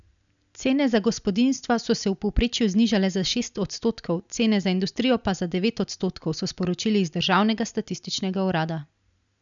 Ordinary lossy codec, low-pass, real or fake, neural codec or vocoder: none; 7.2 kHz; real; none